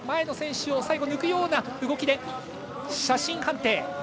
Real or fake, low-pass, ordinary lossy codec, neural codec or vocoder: real; none; none; none